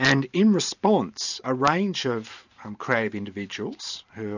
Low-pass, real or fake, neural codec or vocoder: 7.2 kHz; fake; vocoder, 44.1 kHz, 128 mel bands every 512 samples, BigVGAN v2